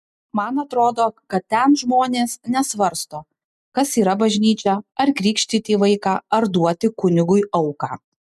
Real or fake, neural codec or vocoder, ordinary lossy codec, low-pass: real; none; MP3, 96 kbps; 14.4 kHz